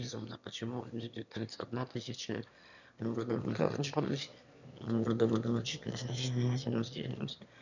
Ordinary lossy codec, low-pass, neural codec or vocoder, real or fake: none; 7.2 kHz; autoencoder, 22.05 kHz, a latent of 192 numbers a frame, VITS, trained on one speaker; fake